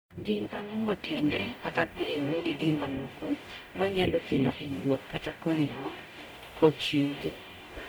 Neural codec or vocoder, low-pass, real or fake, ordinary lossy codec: codec, 44.1 kHz, 0.9 kbps, DAC; none; fake; none